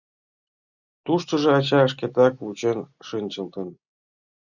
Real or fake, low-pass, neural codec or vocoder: real; 7.2 kHz; none